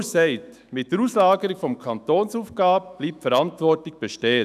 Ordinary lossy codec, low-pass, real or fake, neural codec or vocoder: none; 14.4 kHz; fake; autoencoder, 48 kHz, 128 numbers a frame, DAC-VAE, trained on Japanese speech